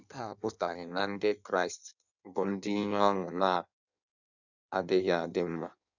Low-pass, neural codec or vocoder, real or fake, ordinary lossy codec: 7.2 kHz; codec, 16 kHz in and 24 kHz out, 1.1 kbps, FireRedTTS-2 codec; fake; none